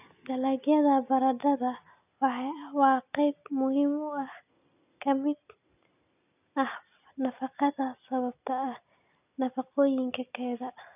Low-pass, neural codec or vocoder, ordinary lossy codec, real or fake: 3.6 kHz; none; none; real